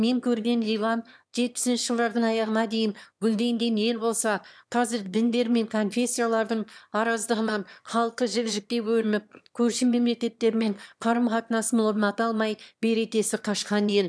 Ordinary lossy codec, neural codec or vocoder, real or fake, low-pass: none; autoencoder, 22.05 kHz, a latent of 192 numbers a frame, VITS, trained on one speaker; fake; none